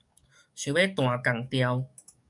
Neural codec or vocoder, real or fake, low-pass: codec, 44.1 kHz, 7.8 kbps, DAC; fake; 10.8 kHz